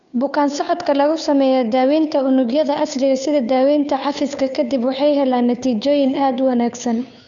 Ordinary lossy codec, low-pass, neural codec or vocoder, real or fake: none; 7.2 kHz; codec, 16 kHz, 2 kbps, FunCodec, trained on Chinese and English, 25 frames a second; fake